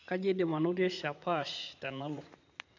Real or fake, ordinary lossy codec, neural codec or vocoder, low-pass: fake; AAC, 48 kbps; vocoder, 44.1 kHz, 80 mel bands, Vocos; 7.2 kHz